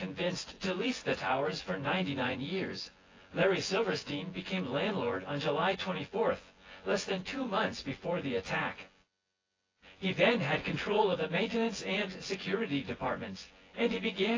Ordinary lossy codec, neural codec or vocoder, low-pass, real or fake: AAC, 32 kbps; vocoder, 24 kHz, 100 mel bands, Vocos; 7.2 kHz; fake